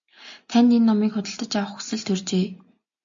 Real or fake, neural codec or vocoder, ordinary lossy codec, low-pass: real; none; AAC, 64 kbps; 7.2 kHz